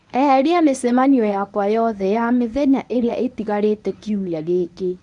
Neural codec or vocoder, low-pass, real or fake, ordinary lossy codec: codec, 24 kHz, 0.9 kbps, WavTokenizer, medium speech release version 1; 10.8 kHz; fake; none